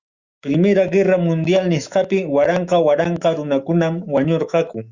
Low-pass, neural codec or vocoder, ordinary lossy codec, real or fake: 7.2 kHz; autoencoder, 48 kHz, 128 numbers a frame, DAC-VAE, trained on Japanese speech; Opus, 64 kbps; fake